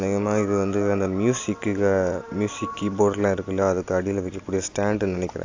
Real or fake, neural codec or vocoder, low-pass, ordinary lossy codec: real; none; 7.2 kHz; AAC, 48 kbps